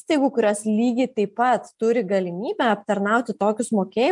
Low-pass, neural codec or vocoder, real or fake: 10.8 kHz; none; real